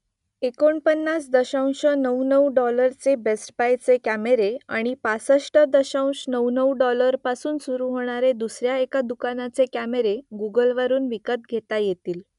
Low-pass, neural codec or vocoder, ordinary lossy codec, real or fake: 10.8 kHz; none; none; real